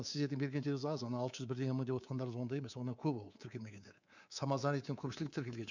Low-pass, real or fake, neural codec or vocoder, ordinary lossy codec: 7.2 kHz; fake; codec, 24 kHz, 3.1 kbps, DualCodec; none